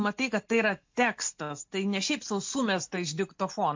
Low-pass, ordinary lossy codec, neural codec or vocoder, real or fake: 7.2 kHz; MP3, 48 kbps; none; real